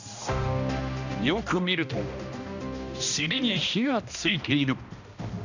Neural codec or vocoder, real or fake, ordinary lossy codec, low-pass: codec, 16 kHz, 1 kbps, X-Codec, HuBERT features, trained on balanced general audio; fake; none; 7.2 kHz